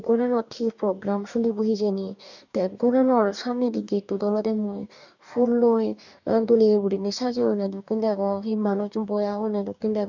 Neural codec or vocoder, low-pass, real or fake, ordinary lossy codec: codec, 44.1 kHz, 2.6 kbps, DAC; 7.2 kHz; fake; none